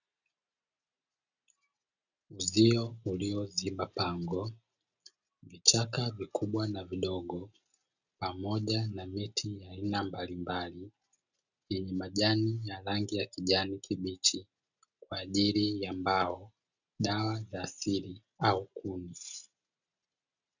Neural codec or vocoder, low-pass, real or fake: none; 7.2 kHz; real